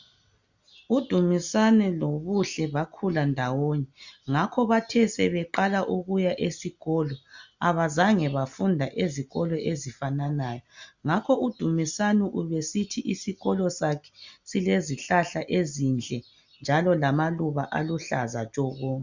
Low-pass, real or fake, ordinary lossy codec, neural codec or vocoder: 7.2 kHz; real; Opus, 64 kbps; none